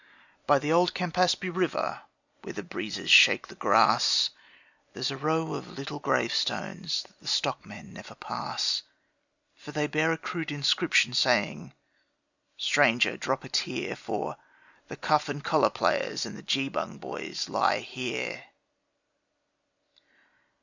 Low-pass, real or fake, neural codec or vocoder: 7.2 kHz; real; none